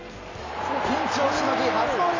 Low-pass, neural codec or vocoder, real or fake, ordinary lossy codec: 7.2 kHz; none; real; AAC, 32 kbps